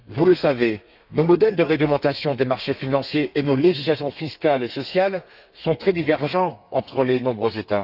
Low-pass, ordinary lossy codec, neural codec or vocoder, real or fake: 5.4 kHz; none; codec, 32 kHz, 1.9 kbps, SNAC; fake